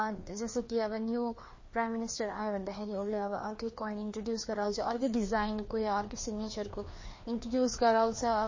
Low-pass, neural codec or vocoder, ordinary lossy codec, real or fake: 7.2 kHz; codec, 16 kHz, 2 kbps, FreqCodec, larger model; MP3, 32 kbps; fake